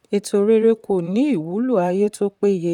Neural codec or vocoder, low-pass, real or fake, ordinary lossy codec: vocoder, 44.1 kHz, 128 mel bands, Pupu-Vocoder; 19.8 kHz; fake; none